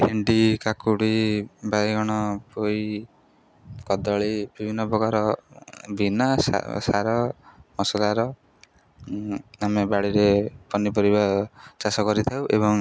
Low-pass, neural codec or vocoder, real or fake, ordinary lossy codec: none; none; real; none